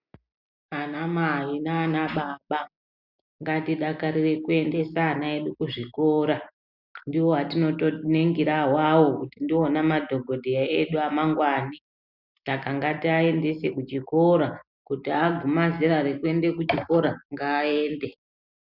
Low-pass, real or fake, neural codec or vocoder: 5.4 kHz; real; none